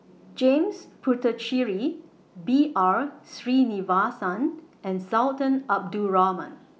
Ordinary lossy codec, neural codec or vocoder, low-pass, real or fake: none; none; none; real